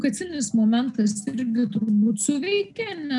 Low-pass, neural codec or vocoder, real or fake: 10.8 kHz; none; real